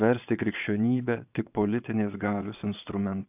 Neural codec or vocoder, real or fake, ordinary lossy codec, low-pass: vocoder, 22.05 kHz, 80 mel bands, Vocos; fake; AAC, 32 kbps; 3.6 kHz